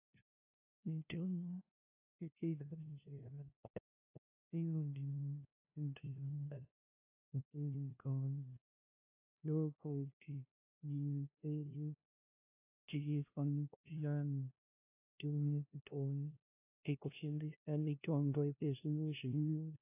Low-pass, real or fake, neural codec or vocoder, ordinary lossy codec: 3.6 kHz; fake; codec, 16 kHz, 0.5 kbps, FunCodec, trained on LibriTTS, 25 frames a second; AAC, 32 kbps